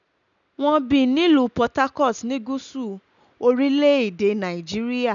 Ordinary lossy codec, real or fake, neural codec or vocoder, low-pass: none; real; none; 7.2 kHz